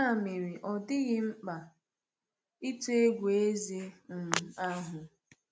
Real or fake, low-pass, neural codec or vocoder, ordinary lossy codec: real; none; none; none